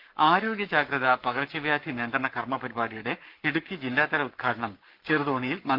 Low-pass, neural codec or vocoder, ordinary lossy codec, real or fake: 5.4 kHz; codec, 44.1 kHz, 7.8 kbps, Pupu-Codec; Opus, 24 kbps; fake